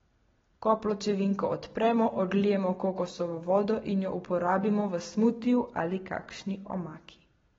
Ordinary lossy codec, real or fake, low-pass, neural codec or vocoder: AAC, 24 kbps; real; 7.2 kHz; none